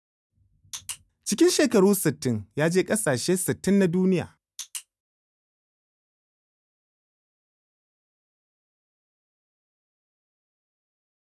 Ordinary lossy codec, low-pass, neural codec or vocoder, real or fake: none; none; none; real